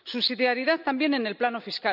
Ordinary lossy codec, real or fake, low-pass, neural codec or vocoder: none; real; 5.4 kHz; none